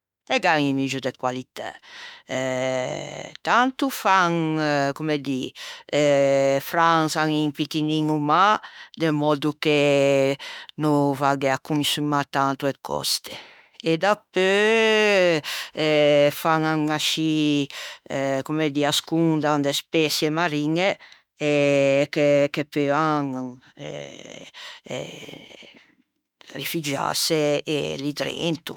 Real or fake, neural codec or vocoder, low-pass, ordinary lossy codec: fake; autoencoder, 48 kHz, 32 numbers a frame, DAC-VAE, trained on Japanese speech; 19.8 kHz; none